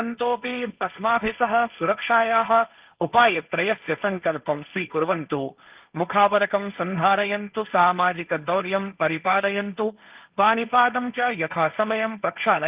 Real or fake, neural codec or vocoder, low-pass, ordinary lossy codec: fake; codec, 16 kHz, 1.1 kbps, Voila-Tokenizer; 3.6 kHz; Opus, 24 kbps